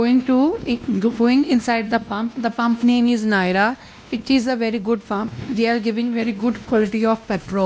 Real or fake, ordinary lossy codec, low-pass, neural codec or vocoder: fake; none; none; codec, 16 kHz, 1 kbps, X-Codec, WavLM features, trained on Multilingual LibriSpeech